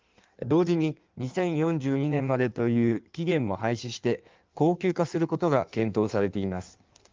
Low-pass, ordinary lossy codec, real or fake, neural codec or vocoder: 7.2 kHz; Opus, 32 kbps; fake; codec, 16 kHz in and 24 kHz out, 1.1 kbps, FireRedTTS-2 codec